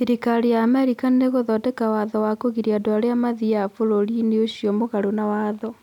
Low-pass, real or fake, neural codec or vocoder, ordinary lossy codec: 19.8 kHz; real; none; none